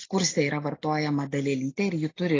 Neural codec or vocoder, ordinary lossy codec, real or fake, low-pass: none; AAC, 32 kbps; real; 7.2 kHz